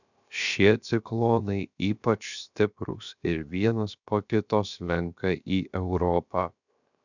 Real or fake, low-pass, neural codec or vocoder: fake; 7.2 kHz; codec, 16 kHz, 0.7 kbps, FocalCodec